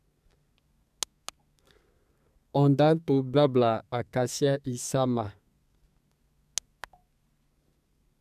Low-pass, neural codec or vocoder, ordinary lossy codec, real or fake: 14.4 kHz; codec, 32 kHz, 1.9 kbps, SNAC; none; fake